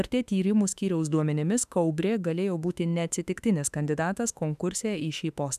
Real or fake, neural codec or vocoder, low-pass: fake; autoencoder, 48 kHz, 32 numbers a frame, DAC-VAE, trained on Japanese speech; 14.4 kHz